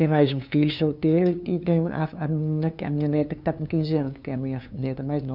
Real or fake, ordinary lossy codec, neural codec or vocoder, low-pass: fake; none; codec, 16 kHz, 2 kbps, FunCodec, trained on LibriTTS, 25 frames a second; 5.4 kHz